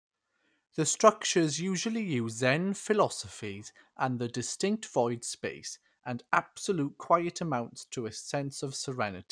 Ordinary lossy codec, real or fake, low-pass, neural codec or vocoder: none; real; 9.9 kHz; none